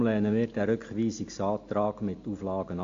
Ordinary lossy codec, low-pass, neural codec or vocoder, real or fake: AAC, 48 kbps; 7.2 kHz; none; real